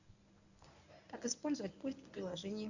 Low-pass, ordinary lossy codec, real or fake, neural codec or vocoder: 7.2 kHz; none; fake; codec, 24 kHz, 0.9 kbps, WavTokenizer, medium speech release version 1